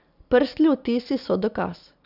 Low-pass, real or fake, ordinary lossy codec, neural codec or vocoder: 5.4 kHz; real; none; none